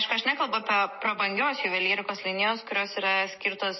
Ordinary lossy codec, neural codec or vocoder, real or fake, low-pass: MP3, 24 kbps; none; real; 7.2 kHz